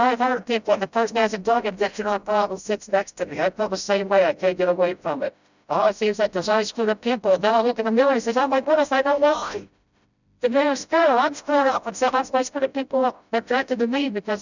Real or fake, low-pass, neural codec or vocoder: fake; 7.2 kHz; codec, 16 kHz, 0.5 kbps, FreqCodec, smaller model